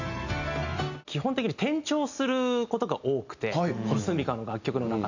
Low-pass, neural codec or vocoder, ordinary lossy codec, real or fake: 7.2 kHz; none; none; real